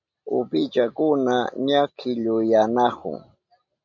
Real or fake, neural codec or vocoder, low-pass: real; none; 7.2 kHz